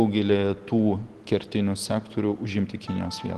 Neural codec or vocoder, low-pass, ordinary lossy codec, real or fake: none; 10.8 kHz; Opus, 16 kbps; real